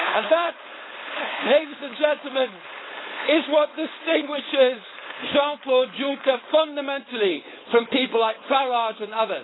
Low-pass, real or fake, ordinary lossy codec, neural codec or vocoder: 7.2 kHz; fake; AAC, 16 kbps; codec, 16 kHz, 4.8 kbps, FACodec